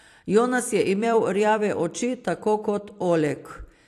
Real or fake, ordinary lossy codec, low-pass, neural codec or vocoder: fake; AAC, 64 kbps; 14.4 kHz; vocoder, 44.1 kHz, 128 mel bands every 256 samples, BigVGAN v2